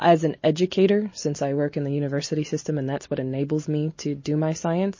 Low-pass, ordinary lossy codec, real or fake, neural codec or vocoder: 7.2 kHz; MP3, 32 kbps; real; none